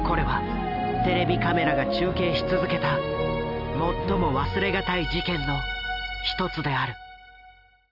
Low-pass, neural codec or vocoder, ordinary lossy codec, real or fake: 5.4 kHz; none; none; real